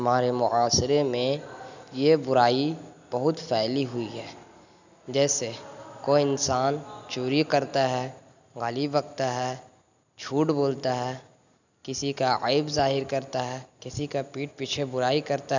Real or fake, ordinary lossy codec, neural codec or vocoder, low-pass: real; none; none; 7.2 kHz